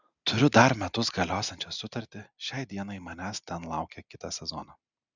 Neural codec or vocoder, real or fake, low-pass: none; real; 7.2 kHz